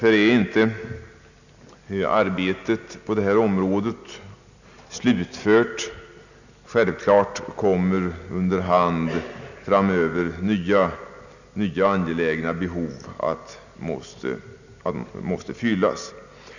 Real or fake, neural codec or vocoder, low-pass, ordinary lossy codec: real; none; 7.2 kHz; none